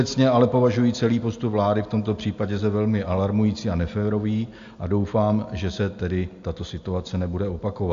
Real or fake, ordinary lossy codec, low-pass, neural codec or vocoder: real; AAC, 64 kbps; 7.2 kHz; none